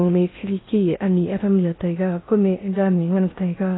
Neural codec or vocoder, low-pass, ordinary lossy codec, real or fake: codec, 16 kHz in and 24 kHz out, 0.6 kbps, FocalCodec, streaming, 2048 codes; 7.2 kHz; AAC, 16 kbps; fake